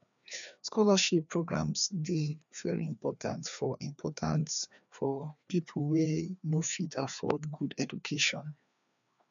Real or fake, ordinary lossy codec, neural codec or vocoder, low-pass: fake; none; codec, 16 kHz, 2 kbps, FreqCodec, larger model; 7.2 kHz